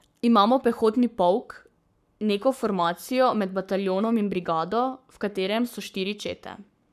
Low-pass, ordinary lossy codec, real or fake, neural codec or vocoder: 14.4 kHz; none; fake; codec, 44.1 kHz, 7.8 kbps, Pupu-Codec